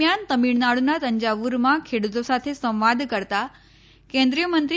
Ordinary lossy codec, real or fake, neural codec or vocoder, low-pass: none; real; none; none